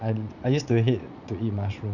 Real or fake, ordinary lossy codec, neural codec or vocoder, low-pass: fake; none; vocoder, 44.1 kHz, 80 mel bands, Vocos; 7.2 kHz